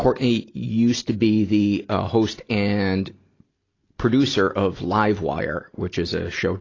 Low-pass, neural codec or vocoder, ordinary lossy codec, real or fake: 7.2 kHz; none; AAC, 32 kbps; real